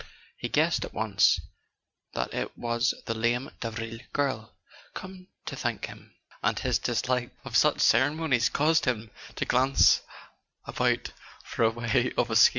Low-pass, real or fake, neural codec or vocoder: 7.2 kHz; real; none